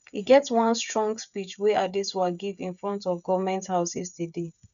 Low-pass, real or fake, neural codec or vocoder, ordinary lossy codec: 7.2 kHz; fake; codec, 16 kHz, 8 kbps, FreqCodec, smaller model; none